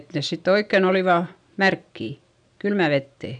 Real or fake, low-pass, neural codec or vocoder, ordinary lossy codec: real; 9.9 kHz; none; none